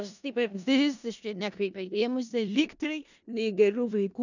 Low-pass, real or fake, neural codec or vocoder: 7.2 kHz; fake; codec, 16 kHz in and 24 kHz out, 0.4 kbps, LongCat-Audio-Codec, four codebook decoder